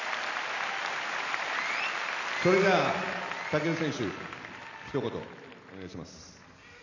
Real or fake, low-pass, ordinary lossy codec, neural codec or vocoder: real; 7.2 kHz; none; none